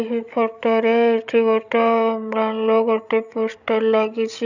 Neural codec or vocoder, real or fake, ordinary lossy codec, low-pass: codec, 16 kHz, 16 kbps, FreqCodec, larger model; fake; none; 7.2 kHz